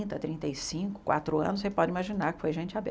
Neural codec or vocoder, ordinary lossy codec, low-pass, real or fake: none; none; none; real